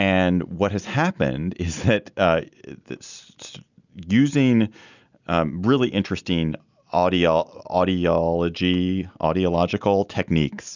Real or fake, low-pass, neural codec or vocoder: real; 7.2 kHz; none